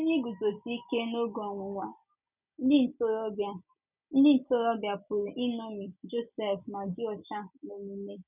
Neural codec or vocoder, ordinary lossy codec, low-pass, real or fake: none; none; 3.6 kHz; real